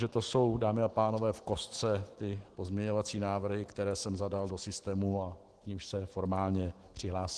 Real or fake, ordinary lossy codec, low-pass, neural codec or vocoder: fake; Opus, 16 kbps; 10.8 kHz; autoencoder, 48 kHz, 128 numbers a frame, DAC-VAE, trained on Japanese speech